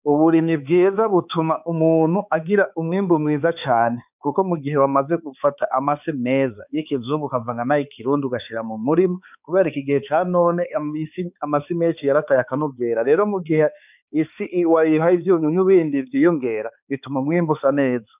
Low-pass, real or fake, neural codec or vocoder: 3.6 kHz; fake; codec, 16 kHz, 4 kbps, X-Codec, HuBERT features, trained on balanced general audio